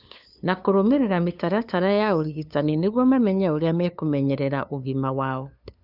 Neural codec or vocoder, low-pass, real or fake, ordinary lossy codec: codec, 16 kHz, 2 kbps, FunCodec, trained on LibriTTS, 25 frames a second; 5.4 kHz; fake; none